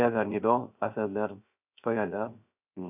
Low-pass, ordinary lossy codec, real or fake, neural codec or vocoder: 3.6 kHz; none; fake; codec, 16 kHz, 0.3 kbps, FocalCodec